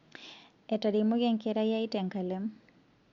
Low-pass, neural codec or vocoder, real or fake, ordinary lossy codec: 7.2 kHz; none; real; Opus, 64 kbps